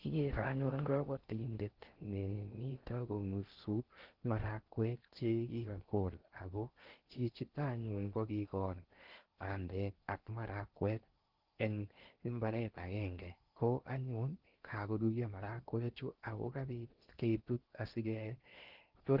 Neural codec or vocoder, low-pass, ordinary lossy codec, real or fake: codec, 16 kHz in and 24 kHz out, 0.6 kbps, FocalCodec, streaming, 4096 codes; 5.4 kHz; Opus, 16 kbps; fake